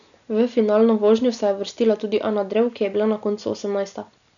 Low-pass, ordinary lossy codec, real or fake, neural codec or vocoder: 7.2 kHz; none; real; none